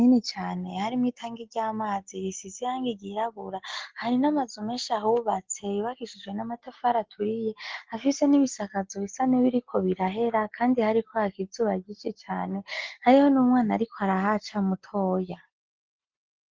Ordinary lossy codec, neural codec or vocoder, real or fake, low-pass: Opus, 16 kbps; none; real; 7.2 kHz